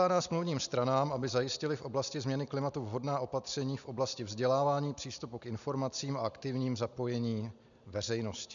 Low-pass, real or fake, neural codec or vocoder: 7.2 kHz; real; none